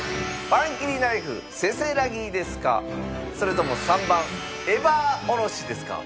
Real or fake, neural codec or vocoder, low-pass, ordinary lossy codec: real; none; none; none